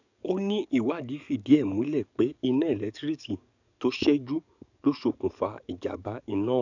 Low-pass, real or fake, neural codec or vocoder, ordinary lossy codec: 7.2 kHz; fake; codec, 44.1 kHz, 7.8 kbps, DAC; none